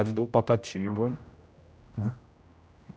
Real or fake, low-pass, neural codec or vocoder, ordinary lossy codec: fake; none; codec, 16 kHz, 0.5 kbps, X-Codec, HuBERT features, trained on general audio; none